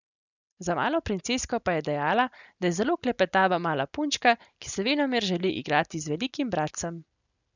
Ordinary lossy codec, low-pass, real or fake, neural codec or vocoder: none; 7.2 kHz; real; none